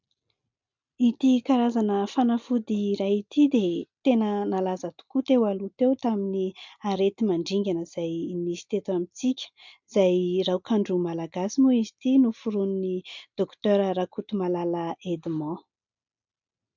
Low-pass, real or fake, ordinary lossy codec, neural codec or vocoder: 7.2 kHz; real; MP3, 64 kbps; none